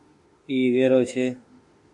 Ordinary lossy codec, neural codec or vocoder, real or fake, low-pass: MP3, 48 kbps; autoencoder, 48 kHz, 32 numbers a frame, DAC-VAE, trained on Japanese speech; fake; 10.8 kHz